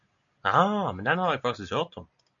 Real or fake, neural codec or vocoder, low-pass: real; none; 7.2 kHz